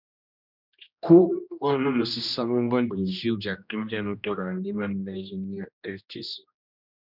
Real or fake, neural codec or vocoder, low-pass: fake; codec, 16 kHz, 1 kbps, X-Codec, HuBERT features, trained on general audio; 5.4 kHz